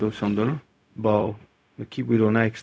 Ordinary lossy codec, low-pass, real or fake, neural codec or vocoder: none; none; fake; codec, 16 kHz, 0.4 kbps, LongCat-Audio-Codec